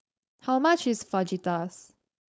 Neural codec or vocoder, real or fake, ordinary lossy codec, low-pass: codec, 16 kHz, 4.8 kbps, FACodec; fake; none; none